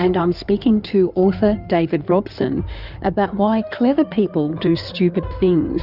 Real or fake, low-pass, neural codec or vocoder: fake; 5.4 kHz; codec, 16 kHz in and 24 kHz out, 2.2 kbps, FireRedTTS-2 codec